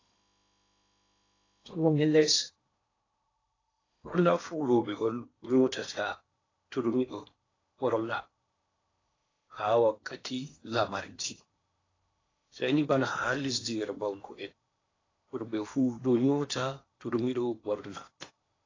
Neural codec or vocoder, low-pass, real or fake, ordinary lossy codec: codec, 16 kHz in and 24 kHz out, 0.8 kbps, FocalCodec, streaming, 65536 codes; 7.2 kHz; fake; AAC, 32 kbps